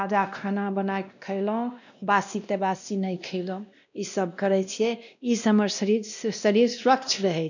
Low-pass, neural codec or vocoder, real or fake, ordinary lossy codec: 7.2 kHz; codec, 16 kHz, 1 kbps, X-Codec, WavLM features, trained on Multilingual LibriSpeech; fake; none